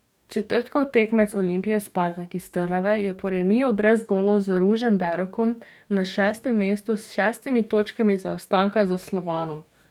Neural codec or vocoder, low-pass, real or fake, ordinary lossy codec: codec, 44.1 kHz, 2.6 kbps, DAC; 19.8 kHz; fake; none